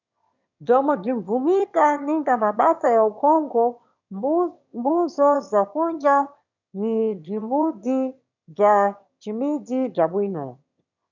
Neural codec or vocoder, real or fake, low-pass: autoencoder, 22.05 kHz, a latent of 192 numbers a frame, VITS, trained on one speaker; fake; 7.2 kHz